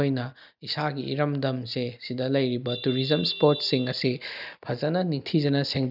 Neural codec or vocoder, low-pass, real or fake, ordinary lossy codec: none; 5.4 kHz; real; none